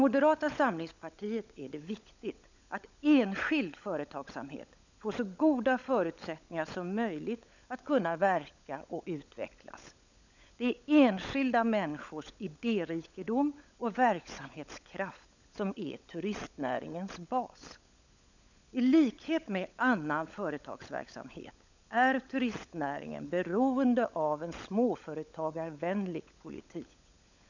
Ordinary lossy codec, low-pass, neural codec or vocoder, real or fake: none; 7.2 kHz; codec, 16 kHz, 16 kbps, FunCodec, trained on LibriTTS, 50 frames a second; fake